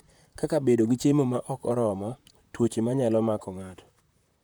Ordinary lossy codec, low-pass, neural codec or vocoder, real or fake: none; none; vocoder, 44.1 kHz, 128 mel bands, Pupu-Vocoder; fake